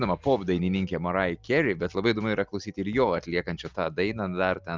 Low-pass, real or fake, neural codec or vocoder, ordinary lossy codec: 7.2 kHz; real; none; Opus, 24 kbps